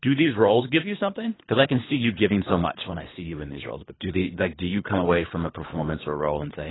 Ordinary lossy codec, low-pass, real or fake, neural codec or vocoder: AAC, 16 kbps; 7.2 kHz; fake; codec, 24 kHz, 3 kbps, HILCodec